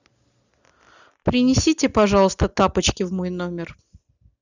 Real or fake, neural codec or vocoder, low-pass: real; none; 7.2 kHz